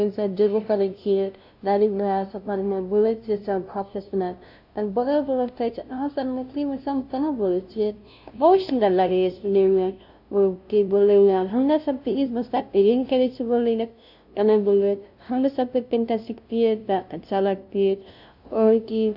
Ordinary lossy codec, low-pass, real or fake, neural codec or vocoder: AAC, 48 kbps; 5.4 kHz; fake; codec, 16 kHz, 0.5 kbps, FunCodec, trained on LibriTTS, 25 frames a second